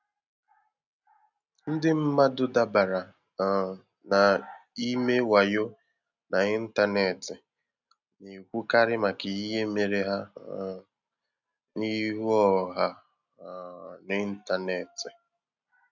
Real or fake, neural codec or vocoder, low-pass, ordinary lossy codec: real; none; 7.2 kHz; none